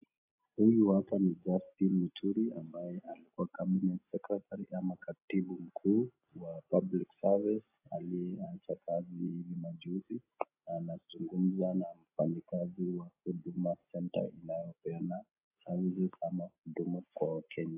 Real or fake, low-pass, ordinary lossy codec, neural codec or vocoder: real; 3.6 kHz; AAC, 32 kbps; none